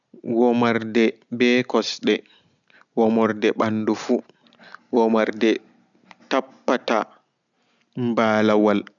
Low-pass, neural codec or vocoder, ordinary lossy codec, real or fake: 7.2 kHz; none; none; real